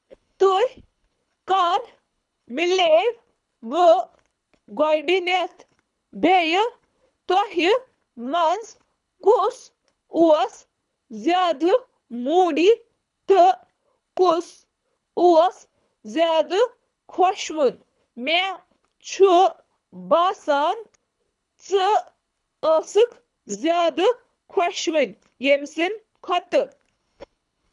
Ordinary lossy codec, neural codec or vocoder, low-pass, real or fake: none; codec, 24 kHz, 3 kbps, HILCodec; 10.8 kHz; fake